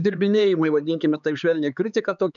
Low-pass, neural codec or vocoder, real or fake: 7.2 kHz; codec, 16 kHz, 4 kbps, X-Codec, HuBERT features, trained on LibriSpeech; fake